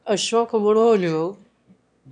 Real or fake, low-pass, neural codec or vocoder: fake; 9.9 kHz; autoencoder, 22.05 kHz, a latent of 192 numbers a frame, VITS, trained on one speaker